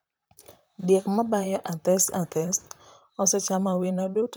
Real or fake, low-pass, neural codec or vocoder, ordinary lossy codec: fake; none; vocoder, 44.1 kHz, 128 mel bands, Pupu-Vocoder; none